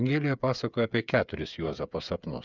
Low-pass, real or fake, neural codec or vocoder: 7.2 kHz; fake; codec, 16 kHz, 8 kbps, FreqCodec, smaller model